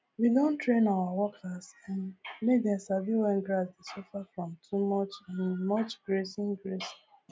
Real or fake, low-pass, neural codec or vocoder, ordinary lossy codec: real; none; none; none